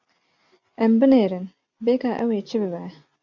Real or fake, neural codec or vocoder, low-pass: real; none; 7.2 kHz